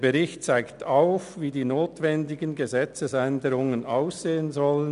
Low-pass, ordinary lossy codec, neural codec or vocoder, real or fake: 10.8 kHz; none; none; real